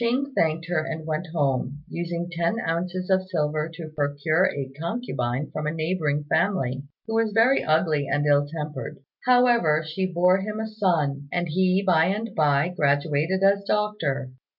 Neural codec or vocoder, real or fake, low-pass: none; real; 5.4 kHz